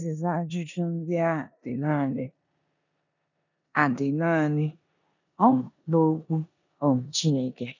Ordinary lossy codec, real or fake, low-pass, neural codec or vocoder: none; fake; 7.2 kHz; codec, 16 kHz in and 24 kHz out, 0.9 kbps, LongCat-Audio-Codec, four codebook decoder